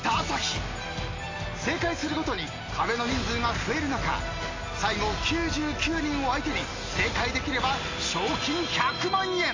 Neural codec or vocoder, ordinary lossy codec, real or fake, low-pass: none; AAC, 32 kbps; real; 7.2 kHz